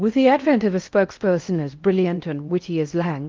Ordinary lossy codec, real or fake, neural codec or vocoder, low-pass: Opus, 32 kbps; fake; codec, 16 kHz in and 24 kHz out, 0.6 kbps, FocalCodec, streaming, 4096 codes; 7.2 kHz